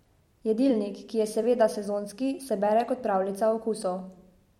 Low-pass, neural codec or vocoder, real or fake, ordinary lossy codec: 19.8 kHz; vocoder, 44.1 kHz, 128 mel bands every 256 samples, BigVGAN v2; fake; MP3, 64 kbps